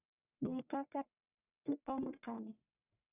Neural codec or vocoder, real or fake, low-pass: codec, 44.1 kHz, 1.7 kbps, Pupu-Codec; fake; 3.6 kHz